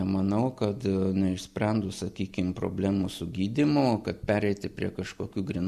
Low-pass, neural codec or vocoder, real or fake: 14.4 kHz; none; real